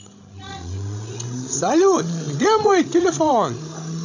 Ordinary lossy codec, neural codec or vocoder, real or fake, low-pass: none; codec, 16 kHz, 16 kbps, FreqCodec, larger model; fake; 7.2 kHz